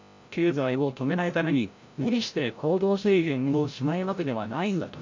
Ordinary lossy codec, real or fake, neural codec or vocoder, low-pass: MP3, 48 kbps; fake; codec, 16 kHz, 0.5 kbps, FreqCodec, larger model; 7.2 kHz